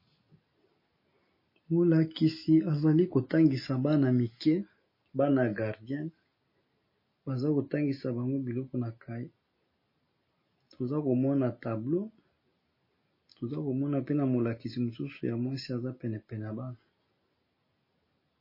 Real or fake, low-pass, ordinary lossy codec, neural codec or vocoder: real; 5.4 kHz; MP3, 24 kbps; none